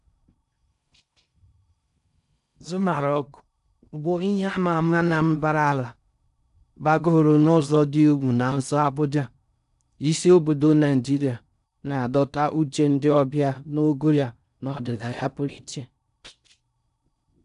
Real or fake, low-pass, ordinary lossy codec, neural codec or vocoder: fake; 10.8 kHz; none; codec, 16 kHz in and 24 kHz out, 0.6 kbps, FocalCodec, streaming, 4096 codes